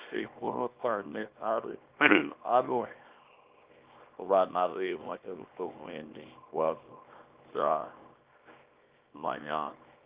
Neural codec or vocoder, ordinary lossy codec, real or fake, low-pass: codec, 24 kHz, 0.9 kbps, WavTokenizer, small release; Opus, 32 kbps; fake; 3.6 kHz